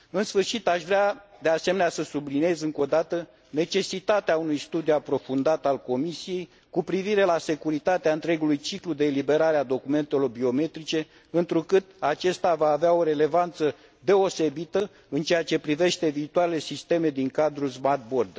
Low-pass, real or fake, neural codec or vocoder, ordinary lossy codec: none; real; none; none